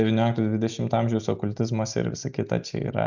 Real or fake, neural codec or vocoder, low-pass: real; none; 7.2 kHz